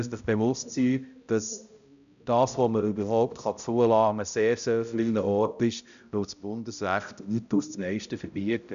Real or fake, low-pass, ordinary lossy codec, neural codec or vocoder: fake; 7.2 kHz; none; codec, 16 kHz, 0.5 kbps, X-Codec, HuBERT features, trained on balanced general audio